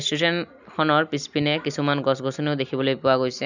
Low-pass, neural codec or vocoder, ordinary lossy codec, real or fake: 7.2 kHz; none; none; real